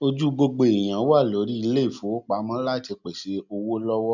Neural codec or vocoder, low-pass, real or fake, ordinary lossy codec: none; 7.2 kHz; real; none